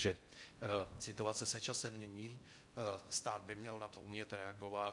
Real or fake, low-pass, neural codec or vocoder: fake; 10.8 kHz; codec, 16 kHz in and 24 kHz out, 0.6 kbps, FocalCodec, streaming, 4096 codes